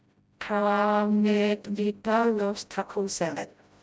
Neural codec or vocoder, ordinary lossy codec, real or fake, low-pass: codec, 16 kHz, 0.5 kbps, FreqCodec, smaller model; none; fake; none